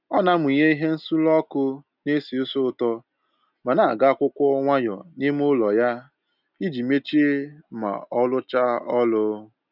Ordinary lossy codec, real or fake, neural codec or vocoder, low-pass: none; real; none; 5.4 kHz